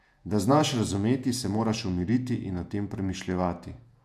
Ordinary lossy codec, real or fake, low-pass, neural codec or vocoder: none; fake; 14.4 kHz; vocoder, 48 kHz, 128 mel bands, Vocos